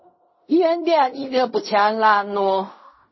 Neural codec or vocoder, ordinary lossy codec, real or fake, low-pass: codec, 16 kHz in and 24 kHz out, 0.4 kbps, LongCat-Audio-Codec, fine tuned four codebook decoder; MP3, 24 kbps; fake; 7.2 kHz